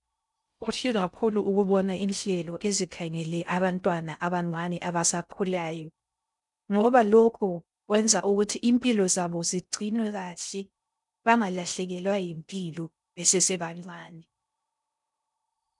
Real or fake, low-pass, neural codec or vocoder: fake; 10.8 kHz; codec, 16 kHz in and 24 kHz out, 0.6 kbps, FocalCodec, streaming, 2048 codes